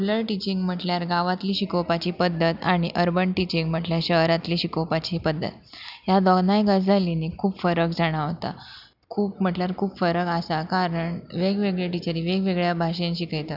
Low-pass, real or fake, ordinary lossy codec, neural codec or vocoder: 5.4 kHz; real; none; none